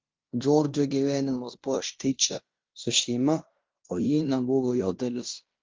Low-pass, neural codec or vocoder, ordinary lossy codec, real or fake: 7.2 kHz; codec, 16 kHz in and 24 kHz out, 0.9 kbps, LongCat-Audio-Codec, fine tuned four codebook decoder; Opus, 32 kbps; fake